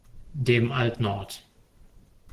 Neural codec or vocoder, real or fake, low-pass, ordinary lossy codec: none; real; 14.4 kHz; Opus, 16 kbps